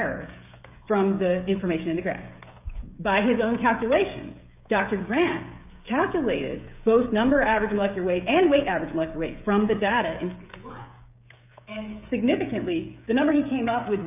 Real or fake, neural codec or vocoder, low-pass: fake; codec, 16 kHz, 16 kbps, FreqCodec, smaller model; 3.6 kHz